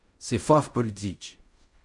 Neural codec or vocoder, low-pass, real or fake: codec, 16 kHz in and 24 kHz out, 0.4 kbps, LongCat-Audio-Codec, fine tuned four codebook decoder; 10.8 kHz; fake